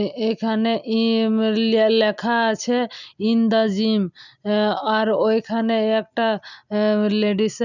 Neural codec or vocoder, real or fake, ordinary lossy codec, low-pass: none; real; none; 7.2 kHz